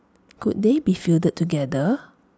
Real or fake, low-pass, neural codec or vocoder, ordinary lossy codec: real; none; none; none